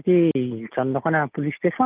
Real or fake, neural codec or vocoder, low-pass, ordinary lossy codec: real; none; 3.6 kHz; Opus, 32 kbps